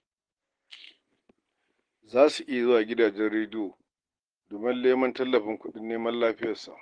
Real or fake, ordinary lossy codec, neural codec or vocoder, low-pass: real; Opus, 16 kbps; none; 9.9 kHz